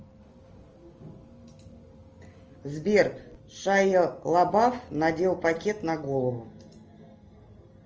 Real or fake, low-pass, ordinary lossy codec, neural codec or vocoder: real; 7.2 kHz; Opus, 24 kbps; none